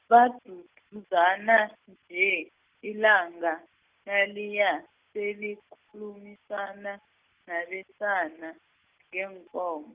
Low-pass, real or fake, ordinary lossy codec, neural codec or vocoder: 3.6 kHz; real; Opus, 24 kbps; none